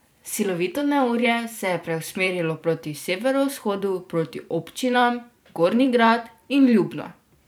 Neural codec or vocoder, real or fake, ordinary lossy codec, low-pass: vocoder, 44.1 kHz, 128 mel bands every 512 samples, BigVGAN v2; fake; none; none